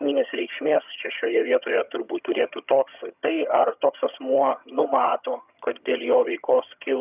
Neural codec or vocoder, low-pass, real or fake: vocoder, 22.05 kHz, 80 mel bands, HiFi-GAN; 3.6 kHz; fake